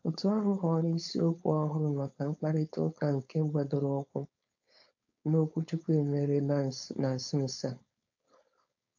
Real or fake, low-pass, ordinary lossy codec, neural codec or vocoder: fake; 7.2 kHz; AAC, 48 kbps; codec, 16 kHz, 4.8 kbps, FACodec